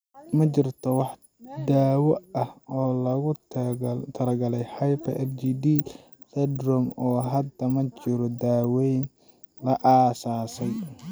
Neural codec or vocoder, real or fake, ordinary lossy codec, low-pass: none; real; none; none